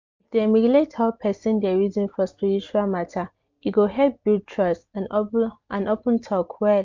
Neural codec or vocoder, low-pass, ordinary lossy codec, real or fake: none; 7.2 kHz; MP3, 64 kbps; real